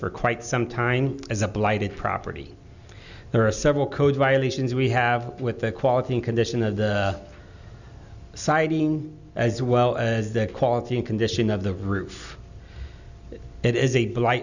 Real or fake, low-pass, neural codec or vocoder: real; 7.2 kHz; none